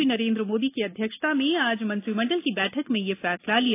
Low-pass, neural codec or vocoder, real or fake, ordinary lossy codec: 3.6 kHz; none; real; AAC, 24 kbps